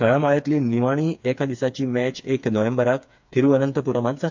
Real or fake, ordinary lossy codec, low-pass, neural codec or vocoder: fake; MP3, 64 kbps; 7.2 kHz; codec, 16 kHz, 4 kbps, FreqCodec, smaller model